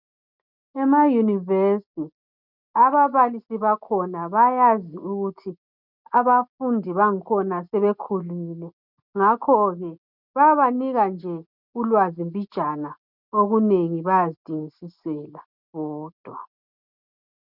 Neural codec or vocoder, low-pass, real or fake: none; 5.4 kHz; real